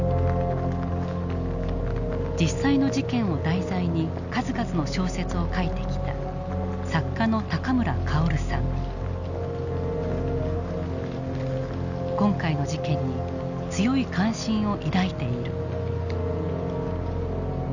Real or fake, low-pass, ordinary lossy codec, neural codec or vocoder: real; 7.2 kHz; none; none